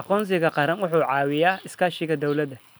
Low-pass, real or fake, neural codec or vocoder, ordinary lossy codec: none; real; none; none